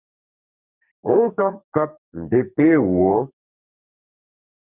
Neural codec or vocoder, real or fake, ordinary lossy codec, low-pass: codec, 44.1 kHz, 2.6 kbps, SNAC; fake; Opus, 64 kbps; 3.6 kHz